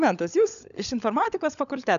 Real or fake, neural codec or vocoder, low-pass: fake; codec, 16 kHz, 16 kbps, FunCodec, trained on LibriTTS, 50 frames a second; 7.2 kHz